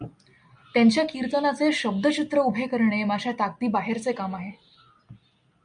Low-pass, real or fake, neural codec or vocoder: 9.9 kHz; real; none